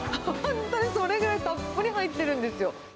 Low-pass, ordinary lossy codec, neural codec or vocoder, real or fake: none; none; none; real